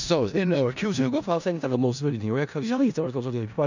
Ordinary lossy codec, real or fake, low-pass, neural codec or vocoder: none; fake; 7.2 kHz; codec, 16 kHz in and 24 kHz out, 0.4 kbps, LongCat-Audio-Codec, four codebook decoder